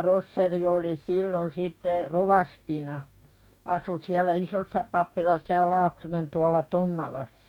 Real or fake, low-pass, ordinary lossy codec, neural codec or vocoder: fake; 19.8 kHz; none; codec, 44.1 kHz, 2.6 kbps, DAC